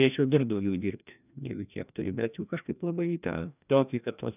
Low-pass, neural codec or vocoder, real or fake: 3.6 kHz; codec, 16 kHz, 1 kbps, FreqCodec, larger model; fake